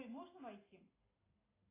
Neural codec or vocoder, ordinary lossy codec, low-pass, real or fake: none; AAC, 16 kbps; 3.6 kHz; real